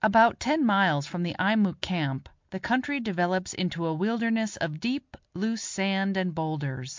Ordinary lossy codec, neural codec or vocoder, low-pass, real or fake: MP3, 64 kbps; none; 7.2 kHz; real